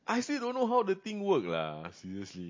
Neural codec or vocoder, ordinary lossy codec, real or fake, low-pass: none; MP3, 32 kbps; real; 7.2 kHz